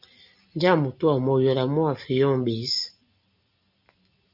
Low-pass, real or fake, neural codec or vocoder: 5.4 kHz; real; none